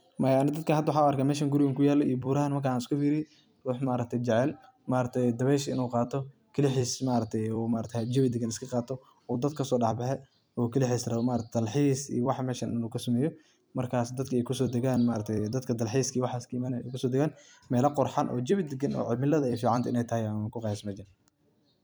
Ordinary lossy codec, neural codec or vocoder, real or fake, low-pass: none; vocoder, 44.1 kHz, 128 mel bands every 256 samples, BigVGAN v2; fake; none